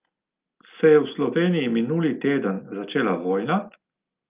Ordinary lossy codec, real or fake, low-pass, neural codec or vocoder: Opus, 24 kbps; real; 3.6 kHz; none